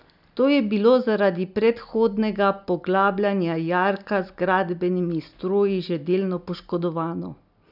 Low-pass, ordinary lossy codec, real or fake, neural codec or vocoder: 5.4 kHz; none; real; none